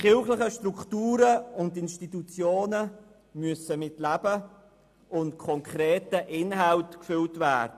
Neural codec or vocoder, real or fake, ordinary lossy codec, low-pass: none; real; AAC, 96 kbps; 14.4 kHz